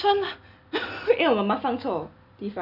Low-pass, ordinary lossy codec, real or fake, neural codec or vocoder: 5.4 kHz; none; real; none